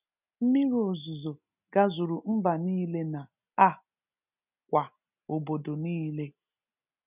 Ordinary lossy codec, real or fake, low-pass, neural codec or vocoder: none; real; 3.6 kHz; none